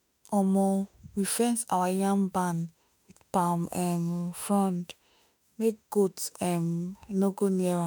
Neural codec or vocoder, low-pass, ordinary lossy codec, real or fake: autoencoder, 48 kHz, 32 numbers a frame, DAC-VAE, trained on Japanese speech; none; none; fake